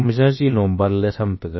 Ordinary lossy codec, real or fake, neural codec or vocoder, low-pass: MP3, 24 kbps; fake; codec, 16 kHz, about 1 kbps, DyCAST, with the encoder's durations; 7.2 kHz